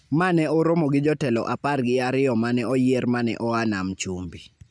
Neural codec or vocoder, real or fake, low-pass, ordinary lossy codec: none; real; 9.9 kHz; none